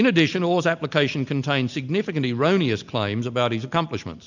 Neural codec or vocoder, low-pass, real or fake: none; 7.2 kHz; real